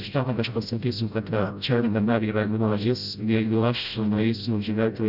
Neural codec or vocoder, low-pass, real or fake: codec, 16 kHz, 0.5 kbps, FreqCodec, smaller model; 5.4 kHz; fake